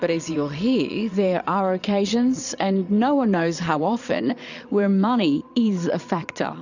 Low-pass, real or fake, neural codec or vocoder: 7.2 kHz; fake; vocoder, 22.05 kHz, 80 mel bands, Vocos